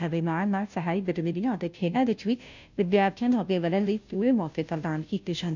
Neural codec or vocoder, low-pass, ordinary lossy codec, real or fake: codec, 16 kHz, 0.5 kbps, FunCodec, trained on Chinese and English, 25 frames a second; 7.2 kHz; none; fake